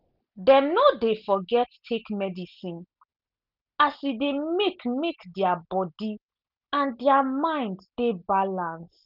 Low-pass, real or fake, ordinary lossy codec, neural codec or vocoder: 5.4 kHz; real; none; none